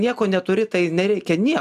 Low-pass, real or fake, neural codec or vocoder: 14.4 kHz; real; none